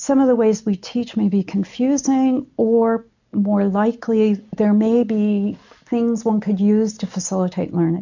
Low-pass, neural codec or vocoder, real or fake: 7.2 kHz; none; real